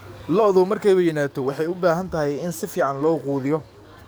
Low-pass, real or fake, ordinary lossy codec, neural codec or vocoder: none; fake; none; codec, 44.1 kHz, 7.8 kbps, DAC